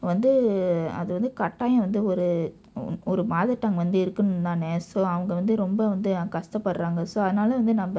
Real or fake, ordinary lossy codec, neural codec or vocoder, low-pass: real; none; none; none